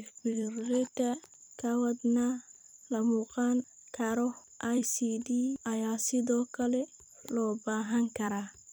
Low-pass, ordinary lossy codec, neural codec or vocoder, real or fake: none; none; vocoder, 44.1 kHz, 128 mel bands every 256 samples, BigVGAN v2; fake